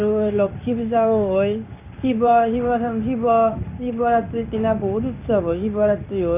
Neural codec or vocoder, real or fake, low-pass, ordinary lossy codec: codec, 16 kHz in and 24 kHz out, 1 kbps, XY-Tokenizer; fake; 3.6 kHz; none